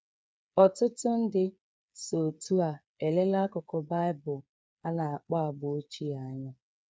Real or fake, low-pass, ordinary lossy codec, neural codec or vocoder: fake; none; none; codec, 16 kHz, 8 kbps, FreqCodec, smaller model